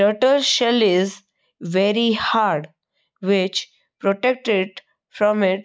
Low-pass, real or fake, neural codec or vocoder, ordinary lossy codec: none; real; none; none